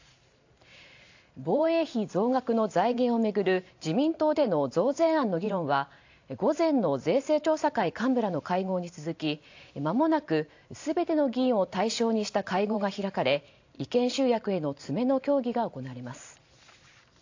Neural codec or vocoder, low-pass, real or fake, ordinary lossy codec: vocoder, 44.1 kHz, 128 mel bands every 512 samples, BigVGAN v2; 7.2 kHz; fake; AAC, 48 kbps